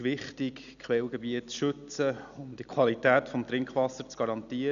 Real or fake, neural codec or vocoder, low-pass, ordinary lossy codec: real; none; 7.2 kHz; none